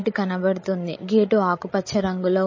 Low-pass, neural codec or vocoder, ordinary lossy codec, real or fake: 7.2 kHz; codec, 16 kHz, 16 kbps, FreqCodec, larger model; MP3, 32 kbps; fake